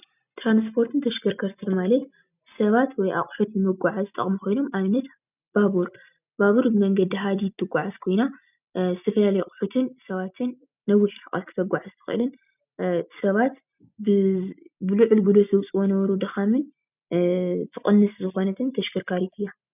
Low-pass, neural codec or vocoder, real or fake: 3.6 kHz; none; real